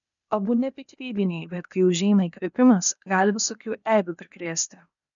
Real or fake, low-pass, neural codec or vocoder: fake; 7.2 kHz; codec, 16 kHz, 0.8 kbps, ZipCodec